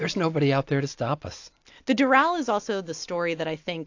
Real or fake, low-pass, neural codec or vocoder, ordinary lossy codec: real; 7.2 kHz; none; AAC, 48 kbps